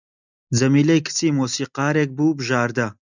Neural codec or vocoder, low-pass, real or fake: none; 7.2 kHz; real